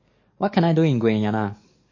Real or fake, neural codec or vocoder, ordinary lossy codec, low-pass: fake; codec, 44.1 kHz, 7.8 kbps, DAC; MP3, 32 kbps; 7.2 kHz